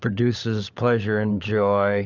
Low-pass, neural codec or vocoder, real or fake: 7.2 kHz; codec, 16 kHz, 16 kbps, FunCodec, trained on LibriTTS, 50 frames a second; fake